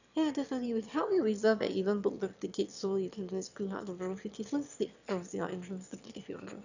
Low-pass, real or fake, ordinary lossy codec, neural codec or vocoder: 7.2 kHz; fake; none; autoencoder, 22.05 kHz, a latent of 192 numbers a frame, VITS, trained on one speaker